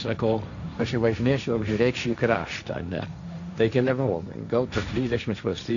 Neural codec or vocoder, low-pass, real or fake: codec, 16 kHz, 1.1 kbps, Voila-Tokenizer; 7.2 kHz; fake